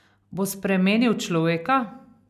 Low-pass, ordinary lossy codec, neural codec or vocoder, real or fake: 14.4 kHz; none; none; real